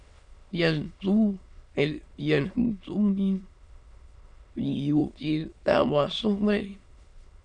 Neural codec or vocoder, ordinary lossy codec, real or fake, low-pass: autoencoder, 22.05 kHz, a latent of 192 numbers a frame, VITS, trained on many speakers; MP3, 96 kbps; fake; 9.9 kHz